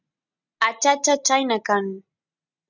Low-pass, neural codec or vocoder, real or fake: 7.2 kHz; none; real